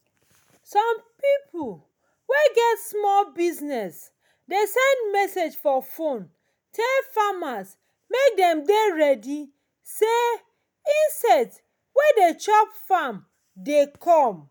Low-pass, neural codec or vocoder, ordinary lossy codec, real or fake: none; none; none; real